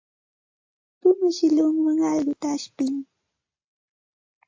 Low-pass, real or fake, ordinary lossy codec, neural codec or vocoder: 7.2 kHz; real; AAC, 48 kbps; none